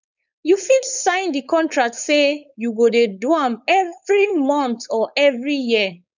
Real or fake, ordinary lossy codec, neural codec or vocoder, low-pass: fake; none; codec, 16 kHz, 4.8 kbps, FACodec; 7.2 kHz